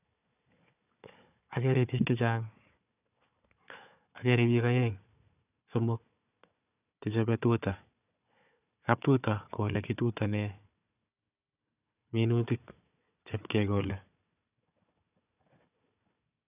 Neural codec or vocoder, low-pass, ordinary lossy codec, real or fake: codec, 16 kHz, 4 kbps, FunCodec, trained on Chinese and English, 50 frames a second; 3.6 kHz; none; fake